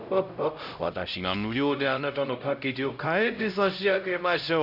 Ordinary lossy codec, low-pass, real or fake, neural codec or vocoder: none; 5.4 kHz; fake; codec, 16 kHz, 0.5 kbps, X-Codec, HuBERT features, trained on LibriSpeech